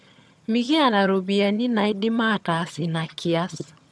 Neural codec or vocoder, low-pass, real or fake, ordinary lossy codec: vocoder, 22.05 kHz, 80 mel bands, HiFi-GAN; none; fake; none